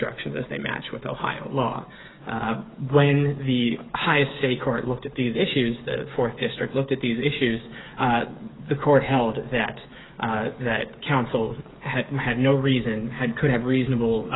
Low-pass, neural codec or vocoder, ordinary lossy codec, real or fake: 7.2 kHz; codec, 16 kHz, 8 kbps, FreqCodec, smaller model; AAC, 16 kbps; fake